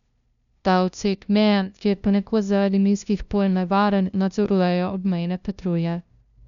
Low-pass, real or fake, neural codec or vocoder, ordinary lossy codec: 7.2 kHz; fake; codec, 16 kHz, 0.5 kbps, FunCodec, trained on LibriTTS, 25 frames a second; none